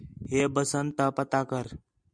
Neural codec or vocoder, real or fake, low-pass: none; real; 9.9 kHz